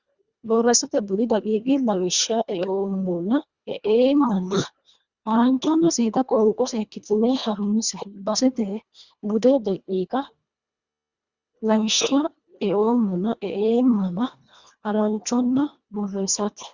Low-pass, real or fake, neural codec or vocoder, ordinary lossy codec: 7.2 kHz; fake; codec, 24 kHz, 1.5 kbps, HILCodec; Opus, 64 kbps